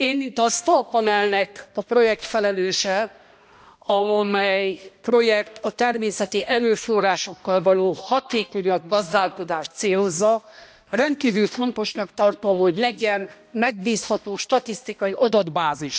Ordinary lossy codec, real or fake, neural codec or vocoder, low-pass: none; fake; codec, 16 kHz, 1 kbps, X-Codec, HuBERT features, trained on balanced general audio; none